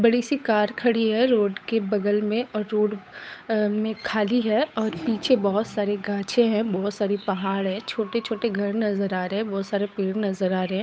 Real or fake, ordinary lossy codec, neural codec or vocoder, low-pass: fake; none; codec, 16 kHz, 8 kbps, FunCodec, trained on Chinese and English, 25 frames a second; none